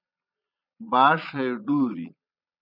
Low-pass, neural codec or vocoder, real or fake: 5.4 kHz; vocoder, 22.05 kHz, 80 mel bands, Vocos; fake